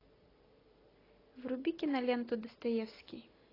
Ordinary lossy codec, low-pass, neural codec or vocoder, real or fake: AAC, 24 kbps; 5.4 kHz; none; real